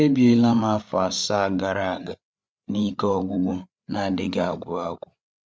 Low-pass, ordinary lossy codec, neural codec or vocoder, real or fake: none; none; codec, 16 kHz, 8 kbps, FreqCodec, larger model; fake